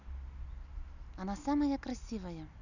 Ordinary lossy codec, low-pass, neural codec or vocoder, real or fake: none; 7.2 kHz; none; real